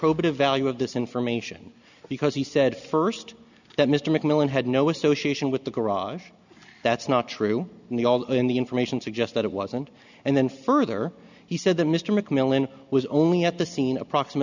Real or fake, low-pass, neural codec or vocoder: real; 7.2 kHz; none